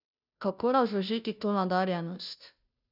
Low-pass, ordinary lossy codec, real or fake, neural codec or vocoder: 5.4 kHz; none; fake; codec, 16 kHz, 0.5 kbps, FunCodec, trained on Chinese and English, 25 frames a second